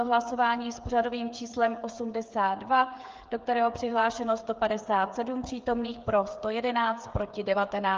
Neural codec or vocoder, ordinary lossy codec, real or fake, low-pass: codec, 16 kHz, 8 kbps, FreqCodec, smaller model; Opus, 24 kbps; fake; 7.2 kHz